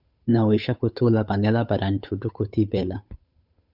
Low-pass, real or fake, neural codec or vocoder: 5.4 kHz; fake; codec, 16 kHz, 8 kbps, FunCodec, trained on Chinese and English, 25 frames a second